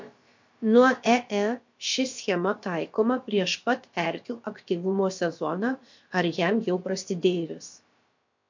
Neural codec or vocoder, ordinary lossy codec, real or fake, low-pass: codec, 16 kHz, about 1 kbps, DyCAST, with the encoder's durations; MP3, 48 kbps; fake; 7.2 kHz